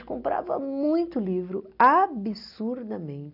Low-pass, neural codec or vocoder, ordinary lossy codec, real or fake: 5.4 kHz; none; none; real